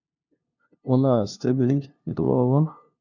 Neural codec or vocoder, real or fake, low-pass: codec, 16 kHz, 0.5 kbps, FunCodec, trained on LibriTTS, 25 frames a second; fake; 7.2 kHz